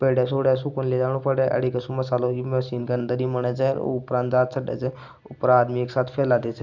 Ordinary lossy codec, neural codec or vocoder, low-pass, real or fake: none; none; 7.2 kHz; real